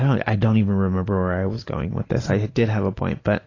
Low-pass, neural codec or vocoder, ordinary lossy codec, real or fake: 7.2 kHz; none; AAC, 32 kbps; real